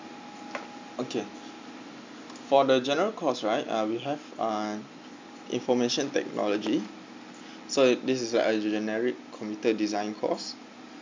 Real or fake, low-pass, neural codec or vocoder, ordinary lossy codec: real; 7.2 kHz; none; MP3, 64 kbps